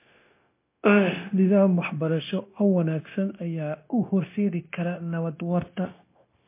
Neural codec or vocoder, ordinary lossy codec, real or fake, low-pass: codec, 16 kHz, 0.9 kbps, LongCat-Audio-Codec; MP3, 24 kbps; fake; 3.6 kHz